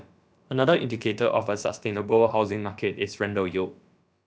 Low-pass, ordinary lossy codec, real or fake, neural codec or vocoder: none; none; fake; codec, 16 kHz, about 1 kbps, DyCAST, with the encoder's durations